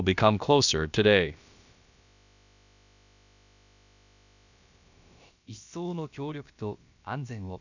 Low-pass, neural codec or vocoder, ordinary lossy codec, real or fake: 7.2 kHz; codec, 16 kHz, about 1 kbps, DyCAST, with the encoder's durations; none; fake